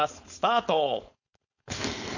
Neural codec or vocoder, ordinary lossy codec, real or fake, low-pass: codec, 16 kHz, 4.8 kbps, FACodec; none; fake; 7.2 kHz